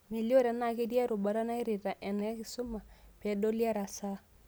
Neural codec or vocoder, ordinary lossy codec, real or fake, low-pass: none; none; real; none